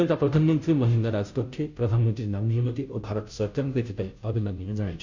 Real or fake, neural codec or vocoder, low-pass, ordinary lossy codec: fake; codec, 16 kHz, 0.5 kbps, FunCodec, trained on Chinese and English, 25 frames a second; 7.2 kHz; MP3, 64 kbps